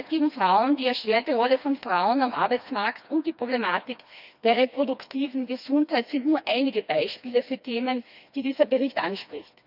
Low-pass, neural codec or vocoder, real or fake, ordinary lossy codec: 5.4 kHz; codec, 16 kHz, 2 kbps, FreqCodec, smaller model; fake; none